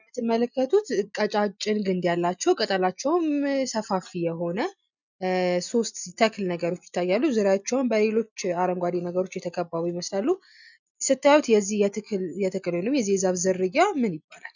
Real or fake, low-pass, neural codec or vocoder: real; 7.2 kHz; none